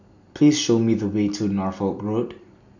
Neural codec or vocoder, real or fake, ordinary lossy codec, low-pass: none; real; none; 7.2 kHz